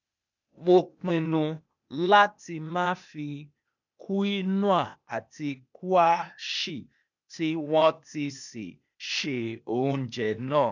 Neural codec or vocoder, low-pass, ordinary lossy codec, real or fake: codec, 16 kHz, 0.8 kbps, ZipCodec; 7.2 kHz; none; fake